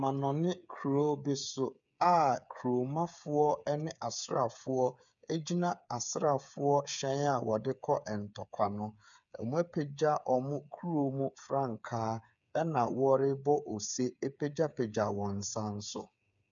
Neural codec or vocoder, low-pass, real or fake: codec, 16 kHz, 8 kbps, FreqCodec, smaller model; 7.2 kHz; fake